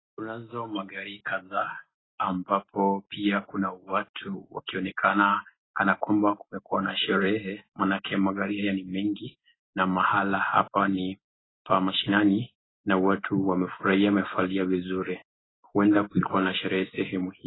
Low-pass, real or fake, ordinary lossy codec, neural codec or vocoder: 7.2 kHz; real; AAC, 16 kbps; none